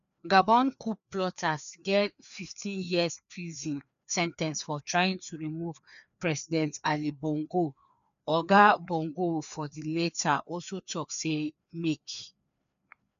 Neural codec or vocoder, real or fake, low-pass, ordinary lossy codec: codec, 16 kHz, 2 kbps, FreqCodec, larger model; fake; 7.2 kHz; none